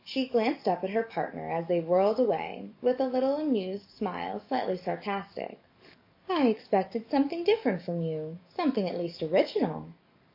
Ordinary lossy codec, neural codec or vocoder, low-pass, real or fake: MP3, 32 kbps; codec, 44.1 kHz, 7.8 kbps, DAC; 5.4 kHz; fake